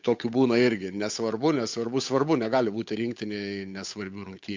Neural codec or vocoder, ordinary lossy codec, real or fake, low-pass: codec, 16 kHz, 8 kbps, FunCodec, trained on Chinese and English, 25 frames a second; MP3, 64 kbps; fake; 7.2 kHz